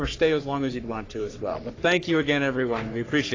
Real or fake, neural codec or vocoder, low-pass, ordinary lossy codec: fake; codec, 44.1 kHz, 3.4 kbps, Pupu-Codec; 7.2 kHz; AAC, 32 kbps